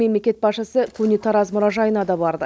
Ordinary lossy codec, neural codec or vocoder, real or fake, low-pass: none; none; real; none